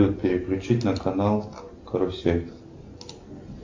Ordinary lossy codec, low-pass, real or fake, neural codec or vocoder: MP3, 48 kbps; 7.2 kHz; real; none